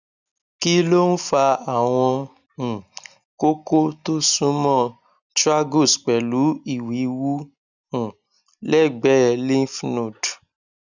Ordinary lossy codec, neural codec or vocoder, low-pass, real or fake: none; none; 7.2 kHz; real